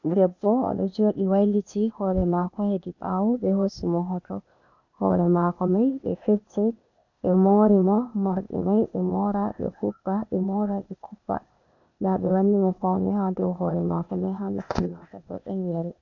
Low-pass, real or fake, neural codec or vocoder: 7.2 kHz; fake; codec, 16 kHz, 0.8 kbps, ZipCodec